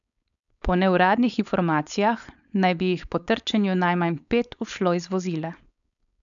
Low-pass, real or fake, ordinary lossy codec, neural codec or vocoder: 7.2 kHz; fake; none; codec, 16 kHz, 4.8 kbps, FACodec